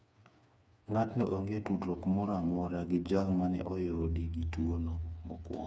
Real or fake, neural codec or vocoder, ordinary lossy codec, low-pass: fake; codec, 16 kHz, 4 kbps, FreqCodec, smaller model; none; none